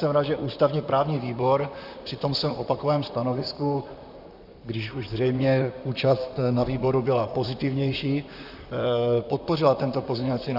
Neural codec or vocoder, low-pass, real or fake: vocoder, 44.1 kHz, 128 mel bands, Pupu-Vocoder; 5.4 kHz; fake